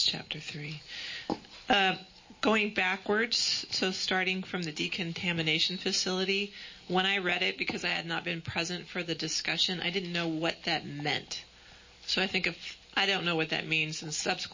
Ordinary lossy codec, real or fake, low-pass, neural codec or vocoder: MP3, 32 kbps; real; 7.2 kHz; none